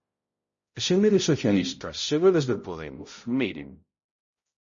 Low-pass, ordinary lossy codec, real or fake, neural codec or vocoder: 7.2 kHz; MP3, 32 kbps; fake; codec, 16 kHz, 0.5 kbps, X-Codec, HuBERT features, trained on balanced general audio